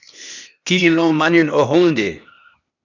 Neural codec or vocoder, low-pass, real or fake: codec, 16 kHz, 0.8 kbps, ZipCodec; 7.2 kHz; fake